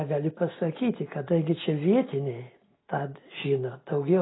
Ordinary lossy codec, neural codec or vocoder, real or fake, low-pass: AAC, 16 kbps; none; real; 7.2 kHz